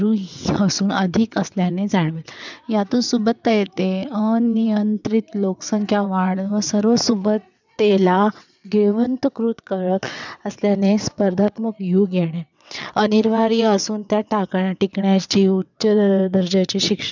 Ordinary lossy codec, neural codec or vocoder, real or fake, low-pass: none; vocoder, 22.05 kHz, 80 mel bands, WaveNeXt; fake; 7.2 kHz